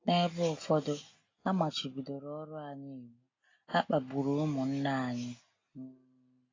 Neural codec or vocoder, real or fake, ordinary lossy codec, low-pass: none; real; AAC, 32 kbps; 7.2 kHz